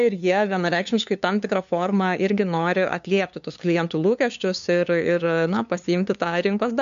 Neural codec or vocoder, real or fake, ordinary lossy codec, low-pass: codec, 16 kHz, 2 kbps, FunCodec, trained on LibriTTS, 25 frames a second; fake; MP3, 64 kbps; 7.2 kHz